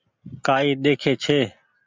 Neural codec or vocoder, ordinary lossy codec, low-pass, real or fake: none; MP3, 64 kbps; 7.2 kHz; real